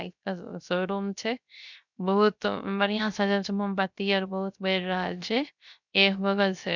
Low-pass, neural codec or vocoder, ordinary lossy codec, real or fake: 7.2 kHz; codec, 16 kHz, 0.3 kbps, FocalCodec; none; fake